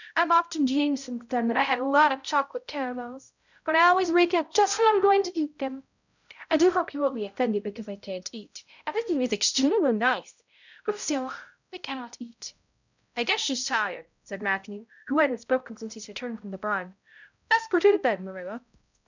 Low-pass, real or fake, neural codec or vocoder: 7.2 kHz; fake; codec, 16 kHz, 0.5 kbps, X-Codec, HuBERT features, trained on balanced general audio